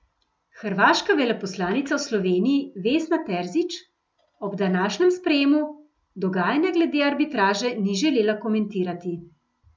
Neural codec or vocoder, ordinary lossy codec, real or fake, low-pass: none; none; real; none